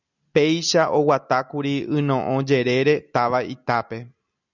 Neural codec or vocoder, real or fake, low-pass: none; real; 7.2 kHz